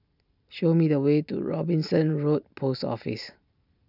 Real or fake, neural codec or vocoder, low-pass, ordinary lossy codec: real; none; 5.4 kHz; none